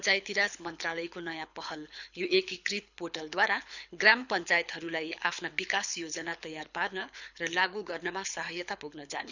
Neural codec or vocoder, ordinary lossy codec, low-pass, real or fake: codec, 24 kHz, 6 kbps, HILCodec; none; 7.2 kHz; fake